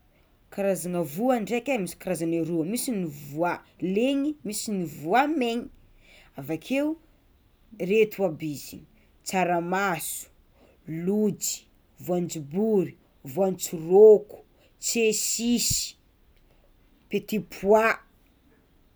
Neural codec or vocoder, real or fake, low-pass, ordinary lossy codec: none; real; none; none